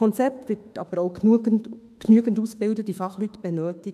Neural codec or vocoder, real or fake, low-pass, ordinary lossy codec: autoencoder, 48 kHz, 32 numbers a frame, DAC-VAE, trained on Japanese speech; fake; 14.4 kHz; none